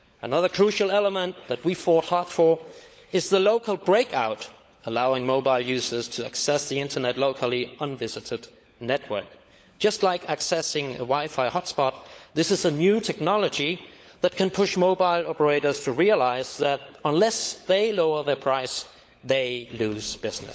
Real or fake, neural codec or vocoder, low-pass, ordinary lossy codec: fake; codec, 16 kHz, 16 kbps, FunCodec, trained on LibriTTS, 50 frames a second; none; none